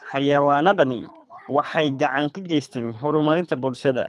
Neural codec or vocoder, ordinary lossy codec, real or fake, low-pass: codec, 24 kHz, 3 kbps, HILCodec; none; fake; none